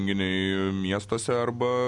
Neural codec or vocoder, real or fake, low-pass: none; real; 10.8 kHz